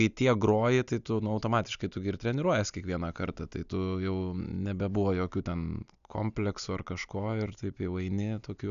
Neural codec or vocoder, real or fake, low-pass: none; real; 7.2 kHz